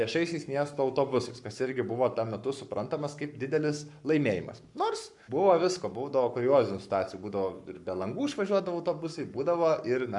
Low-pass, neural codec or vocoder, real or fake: 10.8 kHz; codec, 44.1 kHz, 7.8 kbps, Pupu-Codec; fake